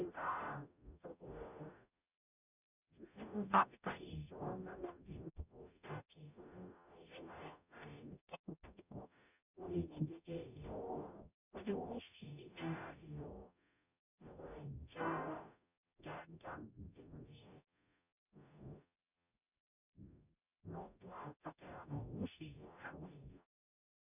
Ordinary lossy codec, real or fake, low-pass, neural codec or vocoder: none; fake; 3.6 kHz; codec, 44.1 kHz, 0.9 kbps, DAC